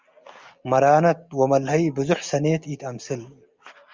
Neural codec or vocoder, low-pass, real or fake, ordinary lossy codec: none; 7.2 kHz; real; Opus, 32 kbps